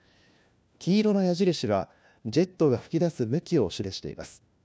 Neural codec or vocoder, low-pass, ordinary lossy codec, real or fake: codec, 16 kHz, 1 kbps, FunCodec, trained on LibriTTS, 50 frames a second; none; none; fake